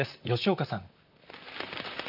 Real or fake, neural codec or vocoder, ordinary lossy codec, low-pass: fake; vocoder, 44.1 kHz, 128 mel bands, Pupu-Vocoder; none; 5.4 kHz